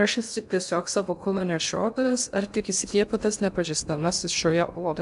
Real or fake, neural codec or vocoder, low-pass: fake; codec, 16 kHz in and 24 kHz out, 0.6 kbps, FocalCodec, streaming, 2048 codes; 10.8 kHz